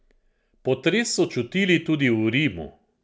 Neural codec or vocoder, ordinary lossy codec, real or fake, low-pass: none; none; real; none